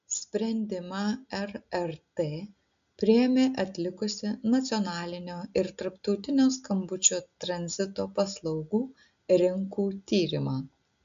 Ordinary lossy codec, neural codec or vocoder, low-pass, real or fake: MP3, 64 kbps; none; 7.2 kHz; real